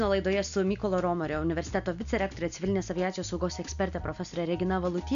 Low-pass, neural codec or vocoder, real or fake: 7.2 kHz; none; real